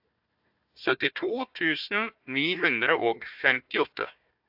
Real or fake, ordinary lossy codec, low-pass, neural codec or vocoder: fake; AAC, 48 kbps; 5.4 kHz; codec, 16 kHz, 1 kbps, FunCodec, trained on Chinese and English, 50 frames a second